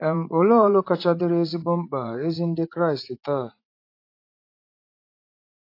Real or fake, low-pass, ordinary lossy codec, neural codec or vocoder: fake; 5.4 kHz; AAC, 32 kbps; vocoder, 44.1 kHz, 80 mel bands, Vocos